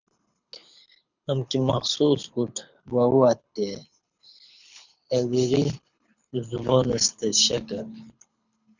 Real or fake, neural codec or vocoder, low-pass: fake; codec, 24 kHz, 6 kbps, HILCodec; 7.2 kHz